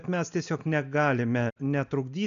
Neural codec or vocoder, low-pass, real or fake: none; 7.2 kHz; real